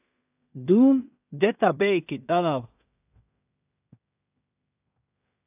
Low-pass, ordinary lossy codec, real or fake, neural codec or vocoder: 3.6 kHz; AAC, 32 kbps; fake; codec, 16 kHz in and 24 kHz out, 0.4 kbps, LongCat-Audio-Codec, two codebook decoder